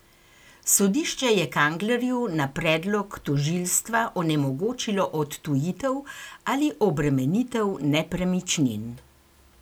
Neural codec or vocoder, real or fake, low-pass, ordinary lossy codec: none; real; none; none